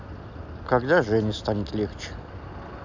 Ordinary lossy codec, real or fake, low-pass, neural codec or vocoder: MP3, 64 kbps; real; 7.2 kHz; none